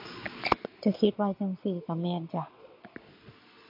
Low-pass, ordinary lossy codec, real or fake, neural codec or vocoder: 5.4 kHz; MP3, 32 kbps; fake; vocoder, 22.05 kHz, 80 mel bands, Vocos